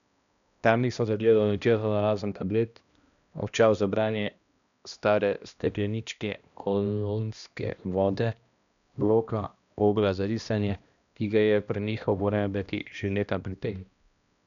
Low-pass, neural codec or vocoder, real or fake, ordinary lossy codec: 7.2 kHz; codec, 16 kHz, 1 kbps, X-Codec, HuBERT features, trained on balanced general audio; fake; none